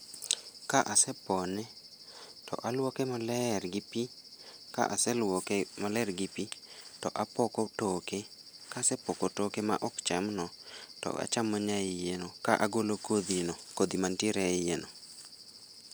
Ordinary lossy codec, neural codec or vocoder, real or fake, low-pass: none; none; real; none